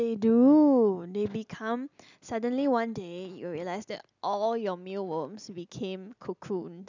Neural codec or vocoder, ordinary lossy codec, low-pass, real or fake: none; none; 7.2 kHz; real